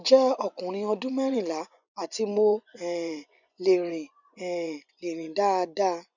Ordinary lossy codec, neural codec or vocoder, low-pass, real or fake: none; vocoder, 24 kHz, 100 mel bands, Vocos; 7.2 kHz; fake